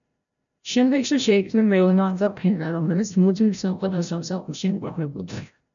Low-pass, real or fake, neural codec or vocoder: 7.2 kHz; fake; codec, 16 kHz, 0.5 kbps, FreqCodec, larger model